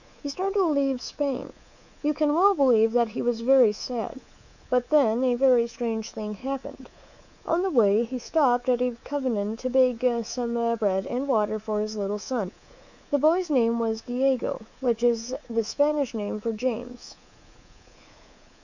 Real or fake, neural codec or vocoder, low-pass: fake; codec, 24 kHz, 3.1 kbps, DualCodec; 7.2 kHz